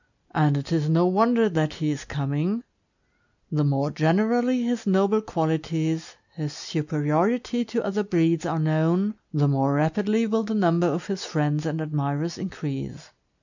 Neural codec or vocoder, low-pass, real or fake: none; 7.2 kHz; real